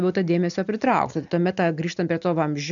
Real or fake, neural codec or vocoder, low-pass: real; none; 7.2 kHz